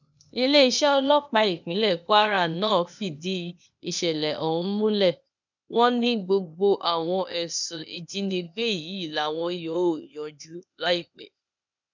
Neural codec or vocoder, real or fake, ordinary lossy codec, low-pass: codec, 16 kHz, 0.8 kbps, ZipCodec; fake; none; 7.2 kHz